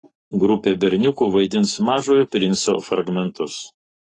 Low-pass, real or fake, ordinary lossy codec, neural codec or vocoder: 10.8 kHz; fake; AAC, 32 kbps; codec, 44.1 kHz, 7.8 kbps, Pupu-Codec